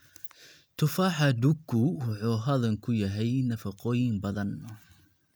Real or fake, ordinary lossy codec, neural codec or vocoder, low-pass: real; none; none; none